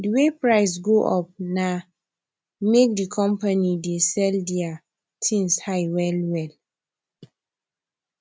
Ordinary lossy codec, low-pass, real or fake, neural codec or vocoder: none; none; real; none